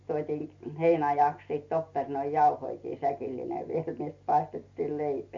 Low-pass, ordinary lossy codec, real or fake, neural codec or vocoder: 7.2 kHz; MP3, 48 kbps; real; none